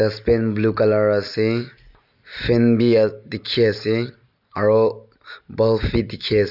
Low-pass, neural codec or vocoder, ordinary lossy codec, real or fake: 5.4 kHz; none; none; real